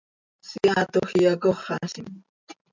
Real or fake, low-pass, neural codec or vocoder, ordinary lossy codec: real; 7.2 kHz; none; MP3, 64 kbps